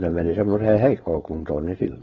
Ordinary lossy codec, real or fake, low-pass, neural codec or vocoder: AAC, 24 kbps; fake; 7.2 kHz; codec, 16 kHz, 4.8 kbps, FACodec